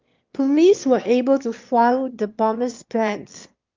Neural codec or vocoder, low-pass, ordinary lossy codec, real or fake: autoencoder, 22.05 kHz, a latent of 192 numbers a frame, VITS, trained on one speaker; 7.2 kHz; Opus, 32 kbps; fake